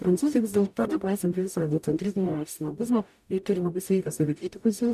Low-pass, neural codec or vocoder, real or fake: 14.4 kHz; codec, 44.1 kHz, 0.9 kbps, DAC; fake